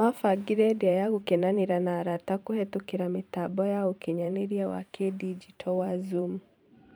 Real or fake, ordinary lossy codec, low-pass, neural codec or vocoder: fake; none; none; vocoder, 44.1 kHz, 128 mel bands every 512 samples, BigVGAN v2